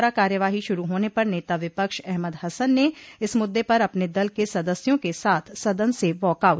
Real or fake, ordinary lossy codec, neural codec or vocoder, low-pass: real; none; none; none